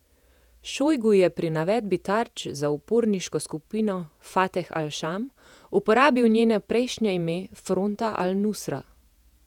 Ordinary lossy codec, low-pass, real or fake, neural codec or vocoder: none; 19.8 kHz; fake; vocoder, 48 kHz, 128 mel bands, Vocos